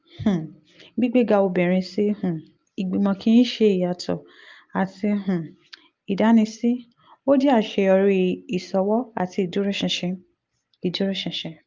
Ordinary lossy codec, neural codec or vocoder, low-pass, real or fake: Opus, 24 kbps; none; 7.2 kHz; real